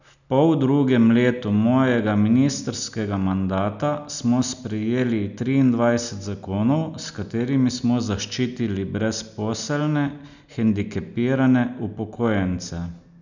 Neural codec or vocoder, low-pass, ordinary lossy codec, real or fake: none; 7.2 kHz; none; real